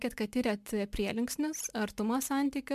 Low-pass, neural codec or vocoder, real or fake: 14.4 kHz; none; real